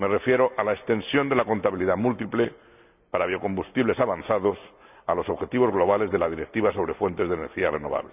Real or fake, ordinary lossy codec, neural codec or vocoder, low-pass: real; none; none; 3.6 kHz